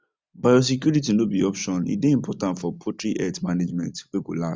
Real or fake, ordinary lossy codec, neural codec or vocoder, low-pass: real; none; none; none